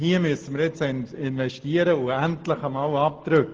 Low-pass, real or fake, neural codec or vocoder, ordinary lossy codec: 7.2 kHz; real; none; Opus, 16 kbps